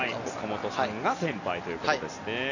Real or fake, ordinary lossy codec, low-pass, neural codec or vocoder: real; none; 7.2 kHz; none